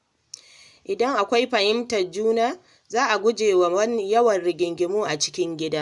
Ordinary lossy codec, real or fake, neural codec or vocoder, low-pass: none; real; none; 10.8 kHz